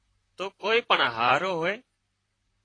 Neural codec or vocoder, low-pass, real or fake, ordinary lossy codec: vocoder, 44.1 kHz, 128 mel bands, Pupu-Vocoder; 9.9 kHz; fake; AAC, 32 kbps